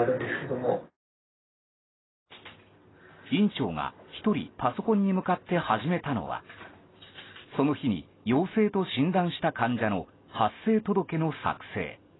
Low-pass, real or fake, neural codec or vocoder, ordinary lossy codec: 7.2 kHz; real; none; AAC, 16 kbps